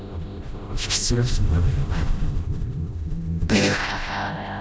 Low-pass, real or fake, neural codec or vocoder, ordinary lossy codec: none; fake; codec, 16 kHz, 0.5 kbps, FreqCodec, smaller model; none